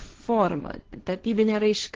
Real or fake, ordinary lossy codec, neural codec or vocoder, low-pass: fake; Opus, 16 kbps; codec, 16 kHz, 1.1 kbps, Voila-Tokenizer; 7.2 kHz